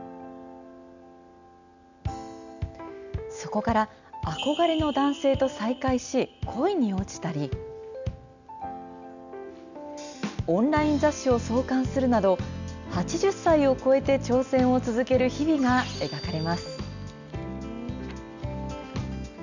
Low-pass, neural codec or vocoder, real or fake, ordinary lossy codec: 7.2 kHz; none; real; none